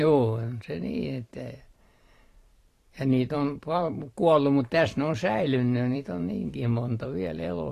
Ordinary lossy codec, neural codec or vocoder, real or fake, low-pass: AAC, 48 kbps; vocoder, 44.1 kHz, 128 mel bands every 512 samples, BigVGAN v2; fake; 14.4 kHz